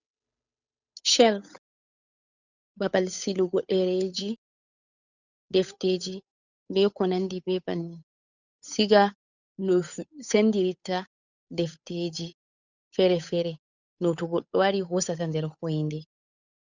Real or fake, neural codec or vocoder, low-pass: fake; codec, 16 kHz, 8 kbps, FunCodec, trained on Chinese and English, 25 frames a second; 7.2 kHz